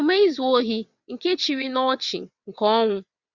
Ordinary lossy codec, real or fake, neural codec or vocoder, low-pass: Opus, 64 kbps; fake; vocoder, 22.05 kHz, 80 mel bands, WaveNeXt; 7.2 kHz